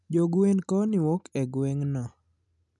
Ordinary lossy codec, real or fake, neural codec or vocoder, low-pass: none; real; none; 10.8 kHz